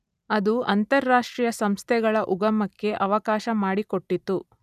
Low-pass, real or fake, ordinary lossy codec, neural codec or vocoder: 14.4 kHz; real; none; none